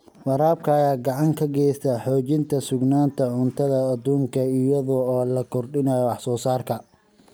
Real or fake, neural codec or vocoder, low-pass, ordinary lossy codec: real; none; none; none